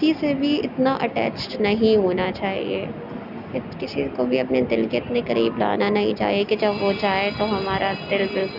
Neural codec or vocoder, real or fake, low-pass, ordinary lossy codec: none; real; 5.4 kHz; none